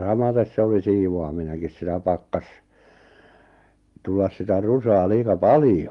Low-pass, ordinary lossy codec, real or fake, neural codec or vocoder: 10.8 kHz; Opus, 24 kbps; real; none